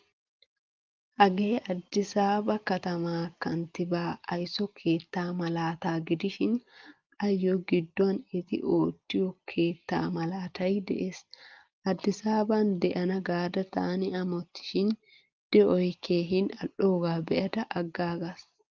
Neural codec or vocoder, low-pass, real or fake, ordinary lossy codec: none; 7.2 kHz; real; Opus, 32 kbps